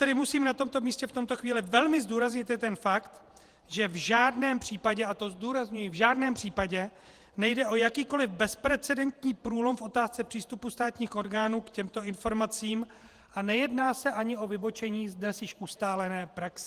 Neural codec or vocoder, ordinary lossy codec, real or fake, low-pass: vocoder, 48 kHz, 128 mel bands, Vocos; Opus, 24 kbps; fake; 14.4 kHz